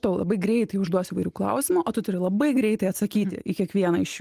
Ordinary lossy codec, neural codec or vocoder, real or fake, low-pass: Opus, 24 kbps; none; real; 14.4 kHz